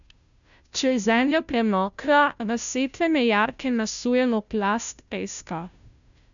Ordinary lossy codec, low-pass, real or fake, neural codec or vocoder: none; 7.2 kHz; fake; codec, 16 kHz, 0.5 kbps, FunCodec, trained on Chinese and English, 25 frames a second